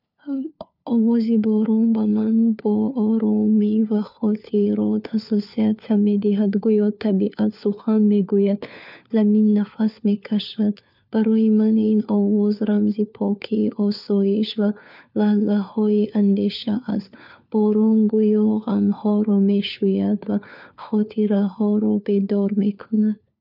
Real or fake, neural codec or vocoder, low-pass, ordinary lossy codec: fake; codec, 16 kHz, 4 kbps, FunCodec, trained on LibriTTS, 50 frames a second; 5.4 kHz; none